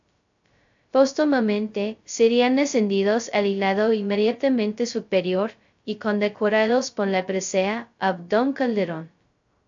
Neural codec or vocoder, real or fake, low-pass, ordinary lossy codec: codec, 16 kHz, 0.2 kbps, FocalCodec; fake; 7.2 kHz; AAC, 64 kbps